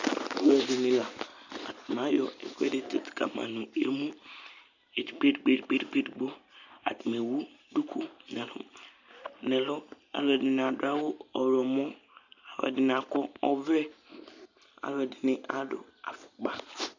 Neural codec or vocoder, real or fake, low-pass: none; real; 7.2 kHz